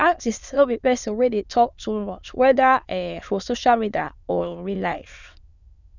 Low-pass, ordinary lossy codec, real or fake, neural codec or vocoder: 7.2 kHz; none; fake; autoencoder, 22.05 kHz, a latent of 192 numbers a frame, VITS, trained on many speakers